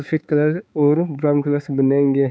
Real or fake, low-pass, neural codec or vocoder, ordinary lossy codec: fake; none; codec, 16 kHz, 4 kbps, X-Codec, HuBERT features, trained on balanced general audio; none